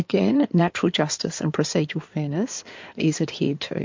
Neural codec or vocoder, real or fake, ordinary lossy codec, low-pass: codec, 16 kHz in and 24 kHz out, 2.2 kbps, FireRedTTS-2 codec; fake; MP3, 48 kbps; 7.2 kHz